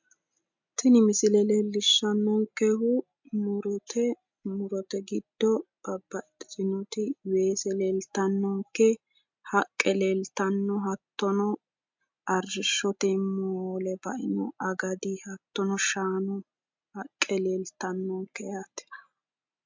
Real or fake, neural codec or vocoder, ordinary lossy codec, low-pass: real; none; MP3, 64 kbps; 7.2 kHz